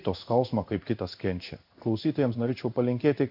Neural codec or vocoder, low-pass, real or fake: codec, 16 kHz in and 24 kHz out, 1 kbps, XY-Tokenizer; 5.4 kHz; fake